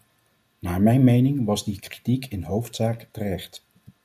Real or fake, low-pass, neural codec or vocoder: real; 14.4 kHz; none